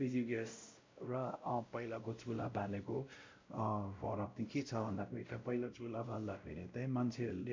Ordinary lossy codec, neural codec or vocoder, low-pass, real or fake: MP3, 48 kbps; codec, 16 kHz, 0.5 kbps, X-Codec, WavLM features, trained on Multilingual LibriSpeech; 7.2 kHz; fake